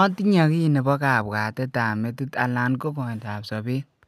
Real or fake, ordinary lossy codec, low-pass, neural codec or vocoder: real; none; 14.4 kHz; none